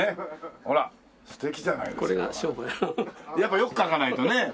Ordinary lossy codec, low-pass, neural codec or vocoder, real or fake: none; none; none; real